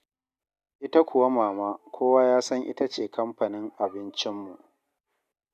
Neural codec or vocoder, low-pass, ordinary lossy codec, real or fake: none; 14.4 kHz; none; real